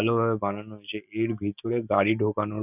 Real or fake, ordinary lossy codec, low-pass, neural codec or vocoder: real; none; 3.6 kHz; none